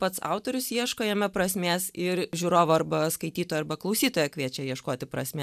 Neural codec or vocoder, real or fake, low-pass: none; real; 14.4 kHz